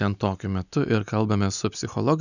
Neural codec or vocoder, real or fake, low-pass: none; real; 7.2 kHz